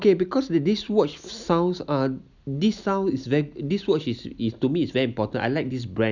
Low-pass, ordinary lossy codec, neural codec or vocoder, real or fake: 7.2 kHz; none; none; real